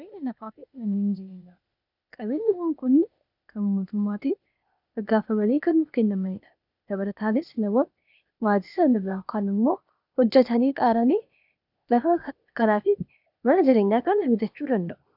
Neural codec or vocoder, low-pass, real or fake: codec, 16 kHz, 0.8 kbps, ZipCodec; 5.4 kHz; fake